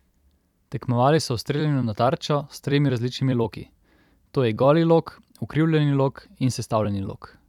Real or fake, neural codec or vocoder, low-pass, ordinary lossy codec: fake; vocoder, 44.1 kHz, 128 mel bands every 256 samples, BigVGAN v2; 19.8 kHz; none